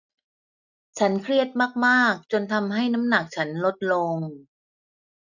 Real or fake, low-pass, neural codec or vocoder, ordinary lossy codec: real; 7.2 kHz; none; none